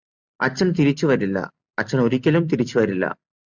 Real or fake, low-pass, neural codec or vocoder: real; 7.2 kHz; none